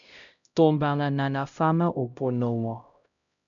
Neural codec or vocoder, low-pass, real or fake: codec, 16 kHz, 0.5 kbps, X-Codec, HuBERT features, trained on LibriSpeech; 7.2 kHz; fake